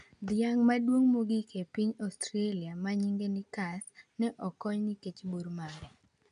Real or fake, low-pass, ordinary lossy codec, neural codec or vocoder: real; 9.9 kHz; none; none